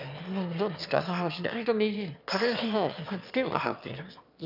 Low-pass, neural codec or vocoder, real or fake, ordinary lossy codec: 5.4 kHz; autoencoder, 22.05 kHz, a latent of 192 numbers a frame, VITS, trained on one speaker; fake; none